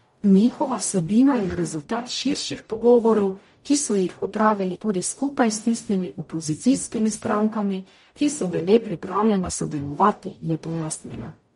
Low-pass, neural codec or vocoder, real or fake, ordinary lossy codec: 19.8 kHz; codec, 44.1 kHz, 0.9 kbps, DAC; fake; MP3, 48 kbps